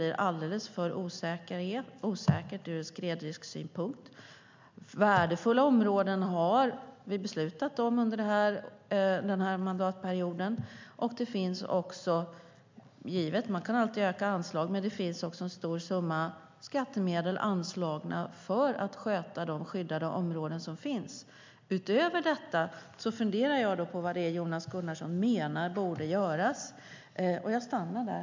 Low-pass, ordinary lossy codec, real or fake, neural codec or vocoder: 7.2 kHz; MP3, 64 kbps; real; none